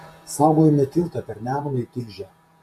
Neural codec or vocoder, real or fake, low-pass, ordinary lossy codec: vocoder, 48 kHz, 128 mel bands, Vocos; fake; 14.4 kHz; MP3, 64 kbps